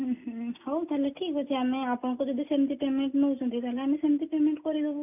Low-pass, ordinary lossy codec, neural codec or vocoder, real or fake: 3.6 kHz; none; none; real